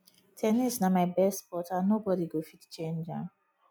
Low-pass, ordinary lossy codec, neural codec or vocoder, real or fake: none; none; none; real